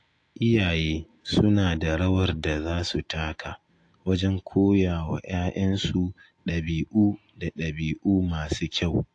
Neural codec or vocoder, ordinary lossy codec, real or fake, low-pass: none; AAC, 48 kbps; real; 9.9 kHz